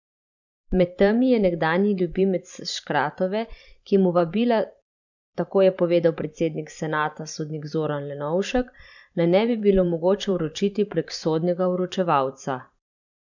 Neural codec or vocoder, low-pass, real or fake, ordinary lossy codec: vocoder, 44.1 kHz, 128 mel bands every 512 samples, BigVGAN v2; 7.2 kHz; fake; none